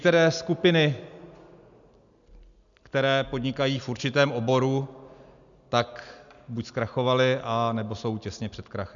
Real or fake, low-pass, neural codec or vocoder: real; 7.2 kHz; none